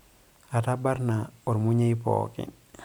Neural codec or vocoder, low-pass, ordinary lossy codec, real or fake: vocoder, 44.1 kHz, 128 mel bands every 256 samples, BigVGAN v2; 19.8 kHz; none; fake